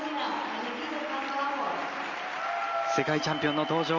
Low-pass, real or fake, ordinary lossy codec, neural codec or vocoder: 7.2 kHz; real; Opus, 32 kbps; none